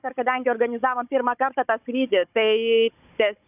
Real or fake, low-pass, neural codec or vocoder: fake; 3.6 kHz; codec, 16 kHz, 16 kbps, FunCodec, trained on Chinese and English, 50 frames a second